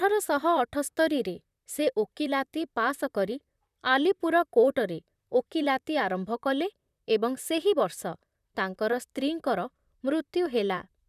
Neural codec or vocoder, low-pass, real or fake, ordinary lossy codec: vocoder, 44.1 kHz, 128 mel bands, Pupu-Vocoder; 14.4 kHz; fake; none